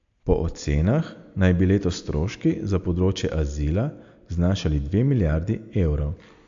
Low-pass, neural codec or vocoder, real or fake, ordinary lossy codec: 7.2 kHz; none; real; AAC, 64 kbps